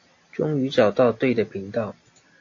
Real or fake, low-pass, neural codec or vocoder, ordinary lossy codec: real; 7.2 kHz; none; AAC, 48 kbps